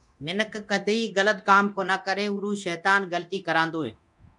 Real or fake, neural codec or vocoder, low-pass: fake; codec, 24 kHz, 0.9 kbps, DualCodec; 10.8 kHz